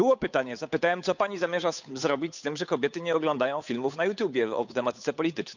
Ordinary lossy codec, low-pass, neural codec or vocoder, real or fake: none; 7.2 kHz; codec, 16 kHz, 8 kbps, FunCodec, trained on Chinese and English, 25 frames a second; fake